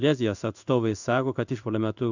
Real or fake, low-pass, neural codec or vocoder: fake; 7.2 kHz; codec, 16 kHz in and 24 kHz out, 1 kbps, XY-Tokenizer